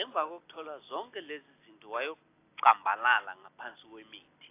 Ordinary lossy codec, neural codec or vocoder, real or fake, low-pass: AAC, 24 kbps; none; real; 3.6 kHz